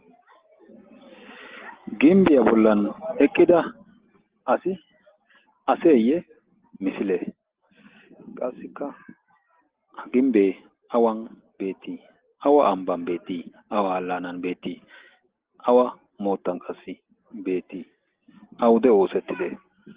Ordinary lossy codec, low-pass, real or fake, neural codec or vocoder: Opus, 16 kbps; 3.6 kHz; real; none